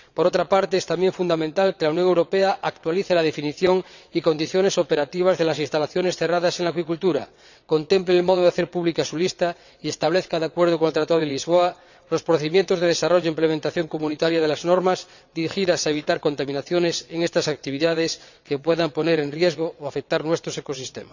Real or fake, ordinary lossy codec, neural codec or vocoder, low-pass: fake; none; vocoder, 22.05 kHz, 80 mel bands, WaveNeXt; 7.2 kHz